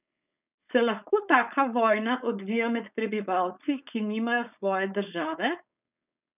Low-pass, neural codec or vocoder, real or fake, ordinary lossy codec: 3.6 kHz; codec, 16 kHz, 4.8 kbps, FACodec; fake; none